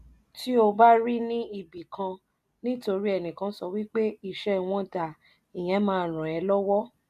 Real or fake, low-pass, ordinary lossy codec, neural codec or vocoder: real; 14.4 kHz; none; none